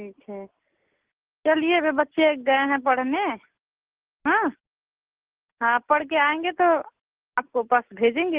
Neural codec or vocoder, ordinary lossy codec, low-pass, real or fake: none; Opus, 16 kbps; 3.6 kHz; real